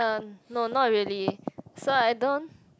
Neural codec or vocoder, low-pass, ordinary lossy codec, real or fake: none; none; none; real